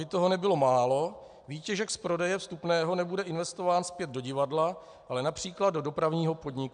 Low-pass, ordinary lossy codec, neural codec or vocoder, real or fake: 9.9 kHz; MP3, 96 kbps; none; real